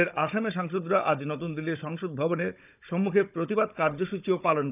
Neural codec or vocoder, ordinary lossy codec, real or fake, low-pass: codec, 16 kHz, 16 kbps, FunCodec, trained on Chinese and English, 50 frames a second; none; fake; 3.6 kHz